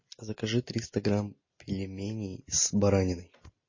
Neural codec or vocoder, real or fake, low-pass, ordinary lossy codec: none; real; 7.2 kHz; MP3, 32 kbps